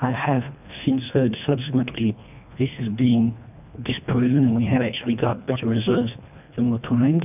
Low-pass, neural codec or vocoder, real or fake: 3.6 kHz; codec, 24 kHz, 1.5 kbps, HILCodec; fake